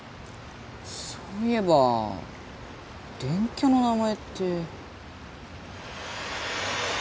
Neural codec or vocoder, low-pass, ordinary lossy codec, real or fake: none; none; none; real